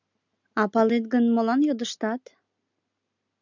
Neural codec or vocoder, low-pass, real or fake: none; 7.2 kHz; real